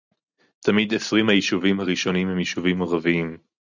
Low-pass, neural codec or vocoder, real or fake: 7.2 kHz; none; real